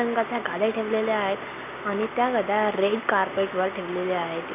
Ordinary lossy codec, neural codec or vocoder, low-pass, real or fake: none; none; 3.6 kHz; real